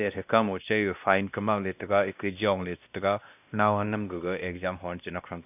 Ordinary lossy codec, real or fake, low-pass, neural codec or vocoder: none; fake; 3.6 kHz; codec, 16 kHz, 1 kbps, X-Codec, WavLM features, trained on Multilingual LibriSpeech